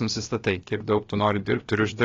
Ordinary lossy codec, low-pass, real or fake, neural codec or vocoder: AAC, 32 kbps; 7.2 kHz; fake; codec, 16 kHz, about 1 kbps, DyCAST, with the encoder's durations